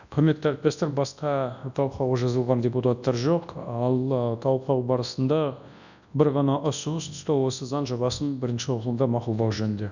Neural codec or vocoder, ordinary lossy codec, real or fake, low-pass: codec, 24 kHz, 0.9 kbps, WavTokenizer, large speech release; none; fake; 7.2 kHz